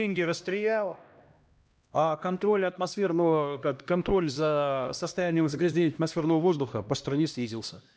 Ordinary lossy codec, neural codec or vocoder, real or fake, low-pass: none; codec, 16 kHz, 1 kbps, X-Codec, HuBERT features, trained on LibriSpeech; fake; none